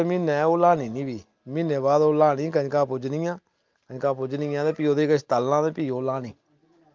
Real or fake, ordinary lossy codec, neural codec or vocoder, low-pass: real; Opus, 24 kbps; none; 7.2 kHz